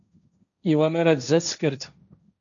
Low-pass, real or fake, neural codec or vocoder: 7.2 kHz; fake; codec, 16 kHz, 1.1 kbps, Voila-Tokenizer